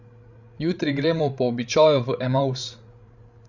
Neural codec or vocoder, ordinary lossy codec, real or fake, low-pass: codec, 16 kHz, 16 kbps, FreqCodec, larger model; none; fake; 7.2 kHz